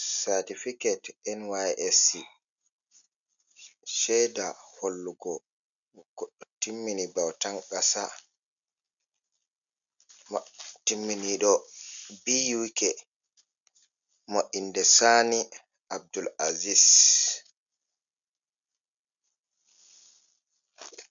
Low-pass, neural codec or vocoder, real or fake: 7.2 kHz; none; real